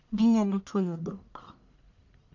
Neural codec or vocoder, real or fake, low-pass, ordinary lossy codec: codec, 44.1 kHz, 1.7 kbps, Pupu-Codec; fake; 7.2 kHz; none